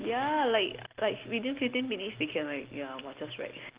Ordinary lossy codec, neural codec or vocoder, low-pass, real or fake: Opus, 16 kbps; none; 3.6 kHz; real